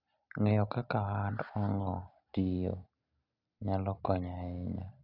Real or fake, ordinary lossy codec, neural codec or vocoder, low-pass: real; none; none; 5.4 kHz